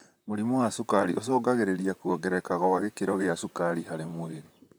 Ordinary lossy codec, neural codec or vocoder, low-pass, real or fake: none; vocoder, 44.1 kHz, 128 mel bands, Pupu-Vocoder; none; fake